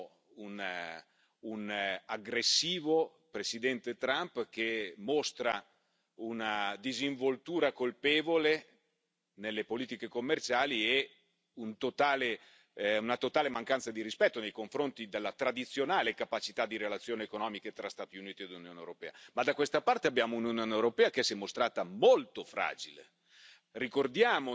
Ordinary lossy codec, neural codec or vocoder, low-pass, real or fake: none; none; none; real